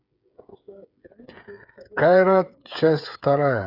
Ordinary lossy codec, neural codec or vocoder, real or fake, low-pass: none; codec, 16 kHz, 8 kbps, FreqCodec, smaller model; fake; 5.4 kHz